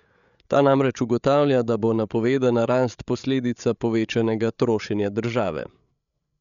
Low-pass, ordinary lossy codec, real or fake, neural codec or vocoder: 7.2 kHz; none; fake; codec, 16 kHz, 8 kbps, FreqCodec, larger model